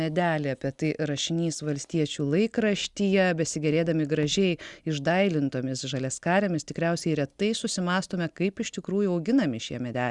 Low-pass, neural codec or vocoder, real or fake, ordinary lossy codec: 10.8 kHz; none; real; Opus, 64 kbps